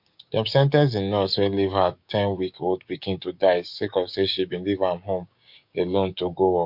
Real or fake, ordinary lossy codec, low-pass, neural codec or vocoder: fake; MP3, 48 kbps; 5.4 kHz; codec, 16 kHz, 6 kbps, DAC